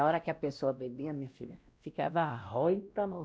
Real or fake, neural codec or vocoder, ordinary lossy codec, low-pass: fake; codec, 16 kHz, 1 kbps, X-Codec, WavLM features, trained on Multilingual LibriSpeech; none; none